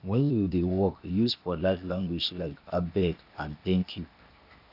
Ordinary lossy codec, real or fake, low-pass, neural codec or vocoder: none; fake; 5.4 kHz; codec, 16 kHz, 0.8 kbps, ZipCodec